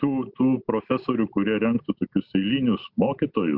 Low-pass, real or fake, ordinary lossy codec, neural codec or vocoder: 5.4 kHz; real; Opus, 64 kbps; none